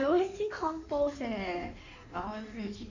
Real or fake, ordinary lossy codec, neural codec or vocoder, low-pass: fake; none; codec, 16 kHz in and 24 kHz out, 1.1 kbps, FireRedTTS-2 codec; 7.2 kHz